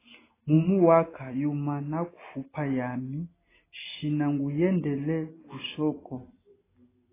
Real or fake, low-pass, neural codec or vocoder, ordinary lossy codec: real; 3.6 kHz; none; AAC, 16 kbps